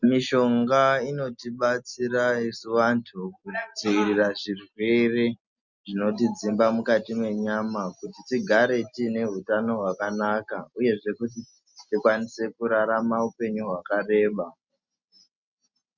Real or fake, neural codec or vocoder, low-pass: real; none; 7.2 kHz